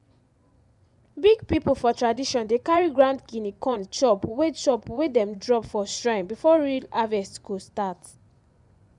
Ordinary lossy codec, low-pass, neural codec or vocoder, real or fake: none; 10.8 kHz; vocoder, 24 kHz, 100 mel bands, Vocos; fake